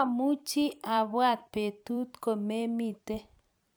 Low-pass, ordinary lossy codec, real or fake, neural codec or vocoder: none; none; fake; vocoder, 44.1 kHz, 128 mel bands every 256 samples, BigVGAN v2